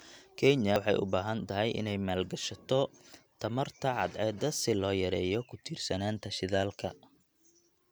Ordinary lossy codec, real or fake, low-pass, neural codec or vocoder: none; real; none; none